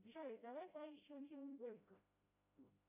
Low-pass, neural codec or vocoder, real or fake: 3.6 kHz; codec, 16 kHz, 0.5 kbps, FreqCodec, smaller model; fake